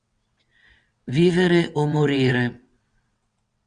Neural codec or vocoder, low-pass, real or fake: vocoder, 22.05 kHz, 80 mel bands, WaveNeXt; 9.9 kHz; fake